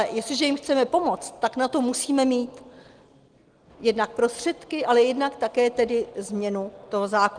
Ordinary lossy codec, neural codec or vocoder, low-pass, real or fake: Opus, 24 kbps; none; 9.9 kHz; real